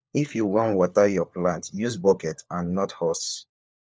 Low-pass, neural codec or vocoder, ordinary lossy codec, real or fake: none; codec, 16 kHz, 4 kbps, FunCodec, trained on LibriTTS, 50 frames a second; none; fake